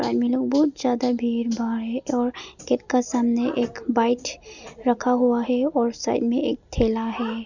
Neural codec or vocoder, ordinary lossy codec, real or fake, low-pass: none; none; real; 7.2 kHz